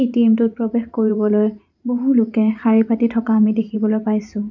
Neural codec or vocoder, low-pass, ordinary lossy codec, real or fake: vocoder, 44.1 kHz, 128 mel bands every 512 samples, BigVGAN v2; 7.2 kHz; none; fake